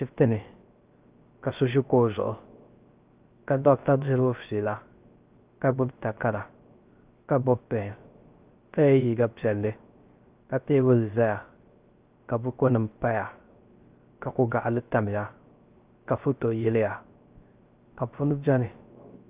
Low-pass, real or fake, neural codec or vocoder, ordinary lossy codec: 3.6 kHz; fake; codec, 16 kHz, 0.3 kbps, FocalCodec; Opus, 32 kbps